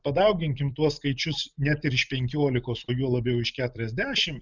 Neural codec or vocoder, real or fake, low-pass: none; real; 7.2 kHz